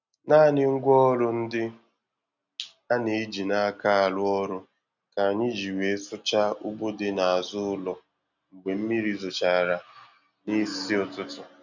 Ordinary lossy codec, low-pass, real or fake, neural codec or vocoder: none; 7.2 kHz; real; none